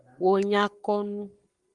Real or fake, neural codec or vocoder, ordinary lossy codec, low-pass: fake; codec, 44.1 kHz, 7.8 kbps, Pupu-Codec; Opus, 24 kbps; 10.8 kHz